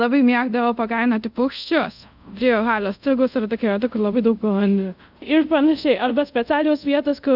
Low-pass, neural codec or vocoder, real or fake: 5.4 kHz; codec, 24 kHz, 0.5 kbps, DualCodec; fake